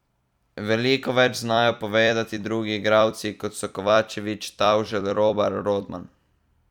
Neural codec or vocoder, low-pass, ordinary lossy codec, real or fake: vocoder, 44.1 kHz, 128 mel bands every 256 samples, BigVGAN v2; 19.8 kHz; none; fake